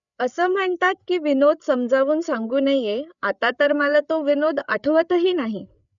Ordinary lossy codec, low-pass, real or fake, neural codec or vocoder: none; 7.2 kHz; fake; codec, 16 kHz, 8 kbps, FreqCodec, larger model